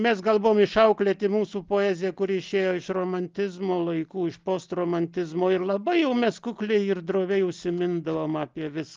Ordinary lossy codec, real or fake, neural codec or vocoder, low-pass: Opus, 32 kbps; real; none; 7.2 kHz